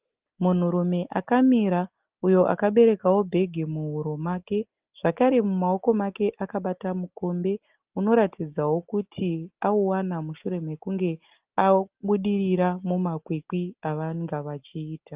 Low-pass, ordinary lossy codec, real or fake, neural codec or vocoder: 3.6 kHz; Opus, 24 kbps; real; none